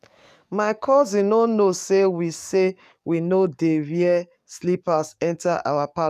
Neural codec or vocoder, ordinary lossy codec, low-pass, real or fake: codec, 44.1 kHz, 7.8 kbps, DAC; none; 14.4 kHz; fake